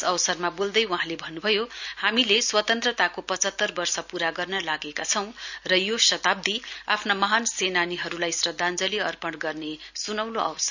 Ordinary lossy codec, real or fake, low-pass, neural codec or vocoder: none; real; 7.2 kHz; none